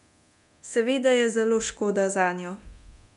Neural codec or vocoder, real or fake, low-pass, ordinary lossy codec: codec, 24 kHz, 0.9 kbps, DualCodec; fake; 10.8 kHz; none